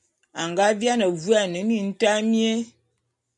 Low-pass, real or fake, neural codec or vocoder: 10.8 kHz; real; none